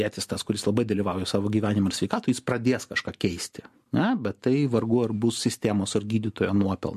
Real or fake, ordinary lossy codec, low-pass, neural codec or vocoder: real; MP3, 64 kbps; 14.4 kHz; none